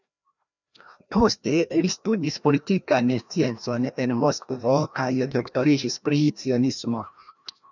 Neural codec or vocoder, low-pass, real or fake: codec, 16 kHz, 1 kbps, FreqCodec, larger model; 7.2 kHz; fake